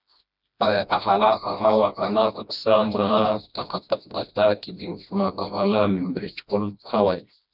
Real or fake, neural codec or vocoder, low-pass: fake; codec, 16 kHz, 1 kbps, FreqCodec, smaller model; 5.4 kHz